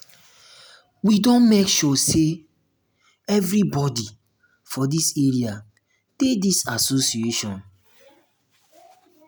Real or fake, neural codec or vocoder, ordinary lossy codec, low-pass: real; none; none; none